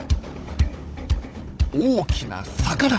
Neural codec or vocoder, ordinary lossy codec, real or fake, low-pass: codec, 16 kHz, 16 kbps, FunCodec, trained on Chinese and English, 50 frames a second; none; fake; none